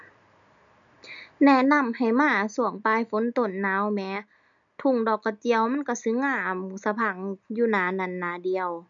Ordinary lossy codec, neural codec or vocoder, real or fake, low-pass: none; none; real; 7.2 kHz